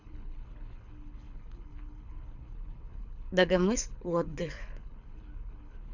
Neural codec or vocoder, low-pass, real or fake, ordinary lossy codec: codec, 24 kHz, 6 kbps, HILCodec; 7.2 kHz; fake; none